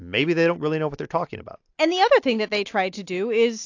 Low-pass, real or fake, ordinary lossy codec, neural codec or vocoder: 7.2 kHz; real; AAC, 48 kbps; none